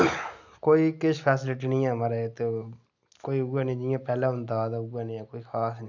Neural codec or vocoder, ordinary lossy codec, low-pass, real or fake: none; none; 7.2 kHz; real